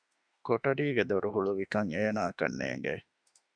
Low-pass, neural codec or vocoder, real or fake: 9.9 kHz; autoencoder, 48 kHz, 32 numbers a frame, DAC-VAE, trained on Japanese speech; fake